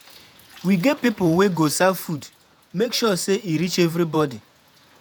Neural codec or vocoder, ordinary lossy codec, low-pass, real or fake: vocoder, 48 kHz, 128 mel bands, Vocos; none; none; fake